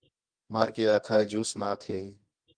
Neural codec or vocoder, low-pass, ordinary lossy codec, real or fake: codec, 24 kHz, 0.9 kbps, WavTokenizer, medium music audio release; 9.9 kHz; Opus, 32 kbps; fake